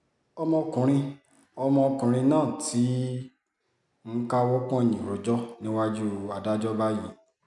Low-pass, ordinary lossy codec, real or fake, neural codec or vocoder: 10.8 kHz; none; real; none